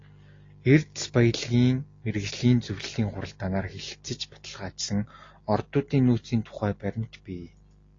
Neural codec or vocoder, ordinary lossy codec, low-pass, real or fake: none; AAC, 32 kbps; 7.2 kHz; real